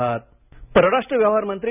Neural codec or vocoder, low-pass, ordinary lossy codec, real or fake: none; 3.6 kHz; none; real